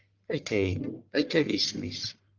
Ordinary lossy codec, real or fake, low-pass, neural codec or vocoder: Opus, 32 kbps; fake; 7.2 kHz; codec, 44.1 kHz, 1.7 kbps, Pupu-Codec